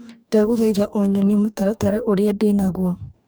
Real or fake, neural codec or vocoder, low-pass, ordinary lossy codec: fake; codec, 44.1 kHz, 2.6 kbps, DAC; none; none